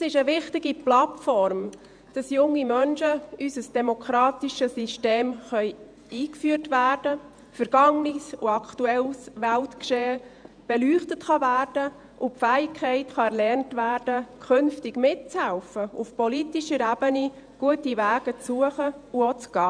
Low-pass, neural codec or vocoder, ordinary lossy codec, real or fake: 9.9 kHz; vocoder, 48 kHz, 128 mel bands, Vocos; none; fake